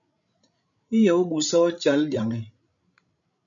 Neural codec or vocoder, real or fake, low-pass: codec, 16 kHz, 16 kbps, FreqCodec, larger model; fake; 7.2 kHz